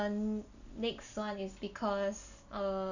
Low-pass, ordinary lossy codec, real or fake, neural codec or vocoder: 7.2 kHz; none; real; none